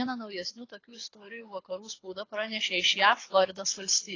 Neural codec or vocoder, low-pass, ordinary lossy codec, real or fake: codec, 24 kHz, 6 kbps, HILCodec; 7.2 kHz; AAC, 32 kbps; fake